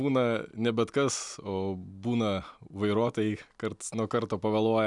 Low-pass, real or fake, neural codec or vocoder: 10.8 kHz; real; none